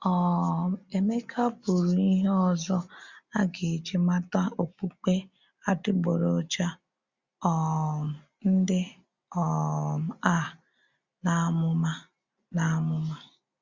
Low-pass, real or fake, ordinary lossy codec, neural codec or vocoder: 7.2 kHz; real; Opus, 64 kbps; none